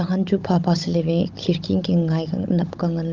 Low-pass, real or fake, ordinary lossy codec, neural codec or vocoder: 7.2 kHz; fake; Opus, 24 kbps; codec, 16 kHz, 16 kbps, FunCodec, trained on Chinese and English, 50 frames a second